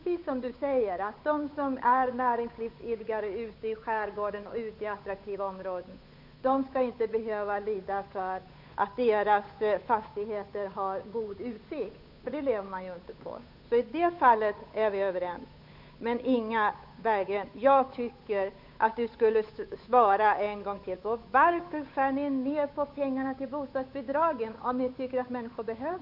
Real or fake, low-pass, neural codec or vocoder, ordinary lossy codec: fake; 5.4 kHz; codec, 16 kHz, 8 kbps, FunCodec, trained on Chinese and English, 25 frames a second; none